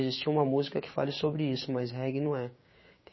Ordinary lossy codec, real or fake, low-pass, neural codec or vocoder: MP3, 24 kbps; fake; 7.2 kHz; codec, 16 kHz, 6 kbps, DAC